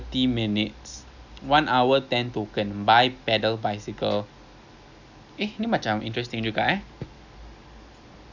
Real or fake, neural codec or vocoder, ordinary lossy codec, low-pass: real; none; none; 7.2 kHz